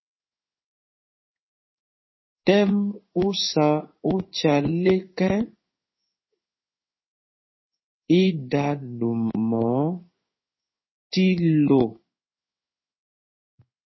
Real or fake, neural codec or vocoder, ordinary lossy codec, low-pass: fake; codec, 16 kHz in and 24 kHz out, 1 kbps, XY-Tokenizer; MP3, 24 kbps; 7.2 kHz